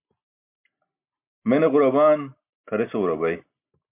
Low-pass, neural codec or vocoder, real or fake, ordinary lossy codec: 3.6 kHz; none; real; MP3, 32 kbps